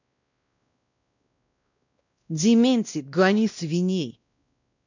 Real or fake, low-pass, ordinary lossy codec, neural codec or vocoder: fake; 7.2 kHz; none; codec, 16 kHz, 1 kbps, X-Codec, WavLM features, trained on Multilingual LibriSpeech